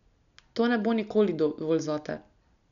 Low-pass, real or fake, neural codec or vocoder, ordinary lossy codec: 7.2 kHz; real; none; none